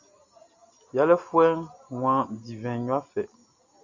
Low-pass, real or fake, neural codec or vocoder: 7.2 kHz; real; none